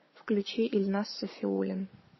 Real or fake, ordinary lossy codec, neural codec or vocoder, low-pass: fake; MP3, 24 kbps; codec, 44.1 kHz, 7.8 kbps, Pupu-Codec; 7.2 kHz